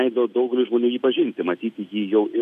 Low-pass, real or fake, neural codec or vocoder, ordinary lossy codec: 14.4 kHz; real; none; AAC, 96 kbps